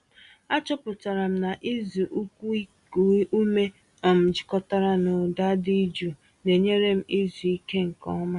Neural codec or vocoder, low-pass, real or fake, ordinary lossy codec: none; 10.8 kHz; real; Opus, 64 kbps